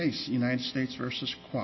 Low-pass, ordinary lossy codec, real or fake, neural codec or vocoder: 7.2 kHz; MP3, 24 kbps; real; none